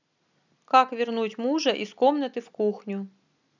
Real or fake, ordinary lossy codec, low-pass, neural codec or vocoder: real; none; 7.2 kHz; none